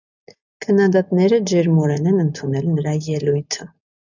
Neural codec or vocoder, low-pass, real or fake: none; 7.2 kHz; real